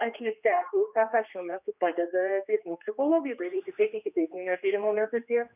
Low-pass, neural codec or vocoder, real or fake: 3.6 kHz; codec, 16 kHz, 2 kbps, X-Codec, HuBERT features, trained on general audio; fake